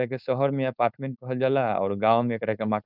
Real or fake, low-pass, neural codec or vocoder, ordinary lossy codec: fake; 5.4 kHz; codec, 16 kHz, 4.8 kbps, FACodec; none